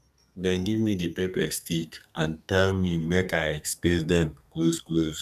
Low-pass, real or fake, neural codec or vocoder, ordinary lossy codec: 14.4 kHz; fake; codec, 32 kHz, 1.9 kbps, SNAC; none